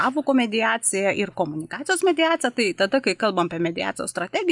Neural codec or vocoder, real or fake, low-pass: none; real; 10.8 kHz